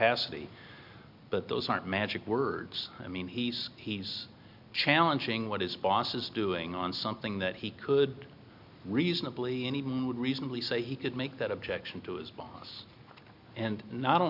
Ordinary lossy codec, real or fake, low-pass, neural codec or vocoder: MP3, 48 kbps; real; 5.4 kHz; none